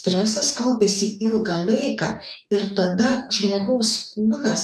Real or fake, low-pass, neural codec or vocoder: fake; 14.4 kHz; codec, 44.1 kHz, 2.6 kbps, DAC